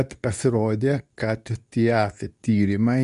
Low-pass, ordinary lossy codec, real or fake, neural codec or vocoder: 10.8 kHz; MP3, 96 kbps; fake; codec, 24 kHz, 0.9 kbps, WavTokenizer, medium speech release version 2